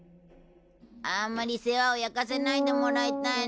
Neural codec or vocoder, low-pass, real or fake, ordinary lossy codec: none; none; real; none